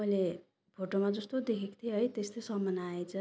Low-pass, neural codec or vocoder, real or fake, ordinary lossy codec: none; none; real; none